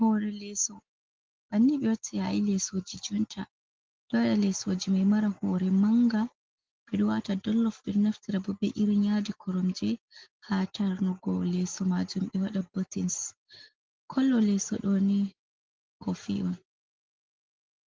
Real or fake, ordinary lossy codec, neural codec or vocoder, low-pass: real; Opus, 16 kbps; none; 7.2 kHz